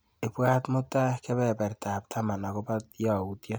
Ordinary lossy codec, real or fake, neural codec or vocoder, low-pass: none; real; none; none